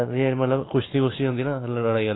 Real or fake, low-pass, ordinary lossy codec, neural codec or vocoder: real; 7.2 kHz; AAC, 16 kbps; none